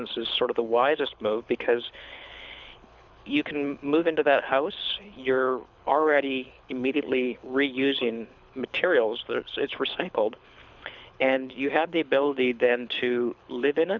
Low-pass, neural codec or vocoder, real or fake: 7.2 kHz; codec, 24 kHz, 6 kbps, HILCodec; fake